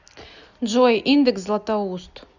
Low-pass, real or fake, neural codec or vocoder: 7.2 kHz; real; none